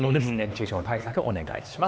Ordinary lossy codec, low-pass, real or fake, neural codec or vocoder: none; none; fake; codec, 16 kHz, 2 kbps, X-Codec, HuBERT features, trained on LibriSpeech